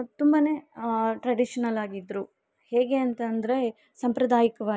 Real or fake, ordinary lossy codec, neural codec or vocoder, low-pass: real; none; none; none